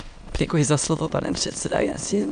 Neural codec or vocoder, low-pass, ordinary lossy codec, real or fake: autoencoder, 22.05 kHz, a latent of 192 numbers a frame, VITS, trained on many speakers; 9.9 kHz; MP3, 96 kbps; fake